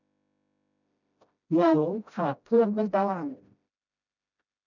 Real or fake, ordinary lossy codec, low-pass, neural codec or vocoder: fake; none; 7.2 kHz; codec, 16 kHz, 0.5 kbps, FreqCodec, smaller model